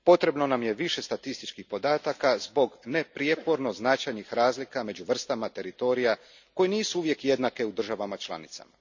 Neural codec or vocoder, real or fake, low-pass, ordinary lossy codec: none; real; 7.2 kHz; none